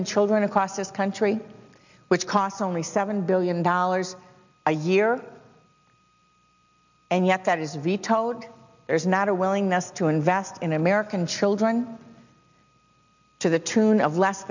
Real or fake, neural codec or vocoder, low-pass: real; none; 7.2 kHz